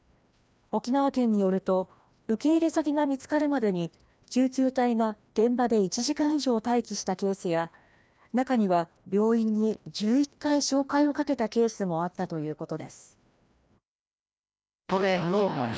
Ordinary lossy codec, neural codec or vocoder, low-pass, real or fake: none; codec, 16 kHz, 1 kbps, FreqCodec, larger model; none; fake